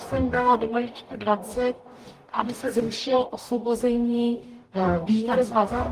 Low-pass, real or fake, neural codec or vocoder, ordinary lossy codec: 14.4 kHz; fake; codec, 44.1 kHz, 0.9 kbps, DAC; Opus, 32 kbps